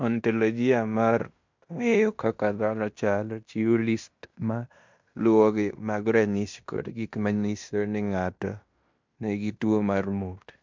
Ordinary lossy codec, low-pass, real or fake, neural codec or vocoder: MP3, 64 kbps; 7.2 kHz; fake; codec, 16 kHz in and 24 kHz out, 0.9 kbps, LongCat-Audio-Codec, fine tuned four codebook decoder